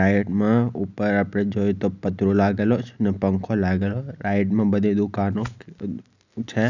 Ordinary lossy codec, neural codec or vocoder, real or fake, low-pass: none; none; real; 7.2 kHz